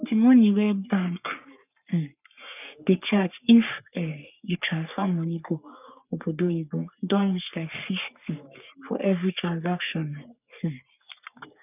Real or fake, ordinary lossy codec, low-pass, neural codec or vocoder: fake; none; 3.6 kHz; codec, 44.1 kHz, 3.4 kbps, Pupu-Codec